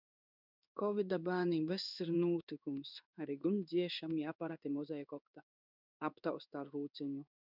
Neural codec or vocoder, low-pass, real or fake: codec, 16 kHz in and 24 kHz out, 1 kbps, XY-Tokenizer; 5.4 kHz; fake